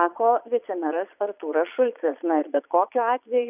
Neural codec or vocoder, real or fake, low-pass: vocoder, 22.05 kHz, 80 mel bands, Vocos; fake; 3.6 kHz